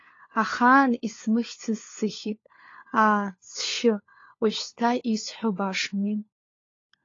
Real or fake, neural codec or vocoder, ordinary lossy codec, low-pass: fake; codec, 16 kHz, 4 kbps, FunCodec, trained on LibriTTS, 50 frames a second; AAC, 32 kbps; 7.2 kHz